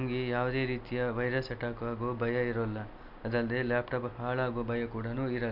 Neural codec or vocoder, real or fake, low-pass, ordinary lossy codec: none; real; 5.4 kHz; MP3, 48 kbps